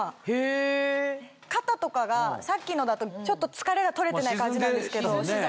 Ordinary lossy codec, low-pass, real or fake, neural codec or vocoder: none; none; real; none